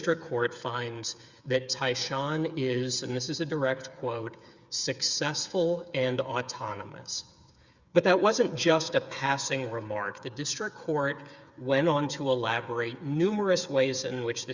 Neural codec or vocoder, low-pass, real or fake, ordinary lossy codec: codec, 16 kHz, 8 kbps, FreqCodec, smaller model; 7.2 kHz; fake; Opus, 64 kbps